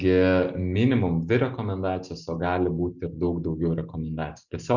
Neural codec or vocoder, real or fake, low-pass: none; real; 7.2 kHz